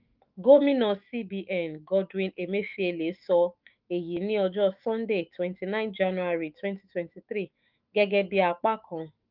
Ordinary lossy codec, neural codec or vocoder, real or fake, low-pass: Opus, 24 kbps; autoencoder, 48 kHz, 128 numbers a frame, DAC-VAE, trained on Japanese speech; fake; 5.4 kHz